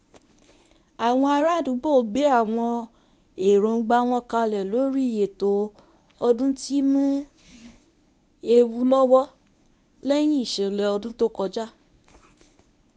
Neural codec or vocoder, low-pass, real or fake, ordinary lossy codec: codec, 24 kHz, 0.9 kbps, WavTokenizer, medium speech release version 1; 10.8 kHz; fake; MP3, 96 kbps